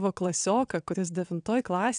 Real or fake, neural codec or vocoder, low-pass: fake; vocoder, 22.05 kHz, 80 mel bands, WaveNeXt; 9.9 kHz